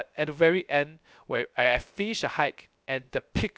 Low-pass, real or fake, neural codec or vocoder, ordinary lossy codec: none; fake; codec, 16 kHz, 0.3 kbps, FocalCodec; none